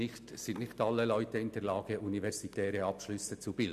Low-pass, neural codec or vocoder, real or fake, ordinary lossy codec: 14.4 kHz; none; real; none